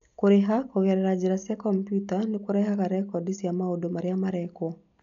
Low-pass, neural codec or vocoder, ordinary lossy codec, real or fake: 7.2 kHz; none; none; real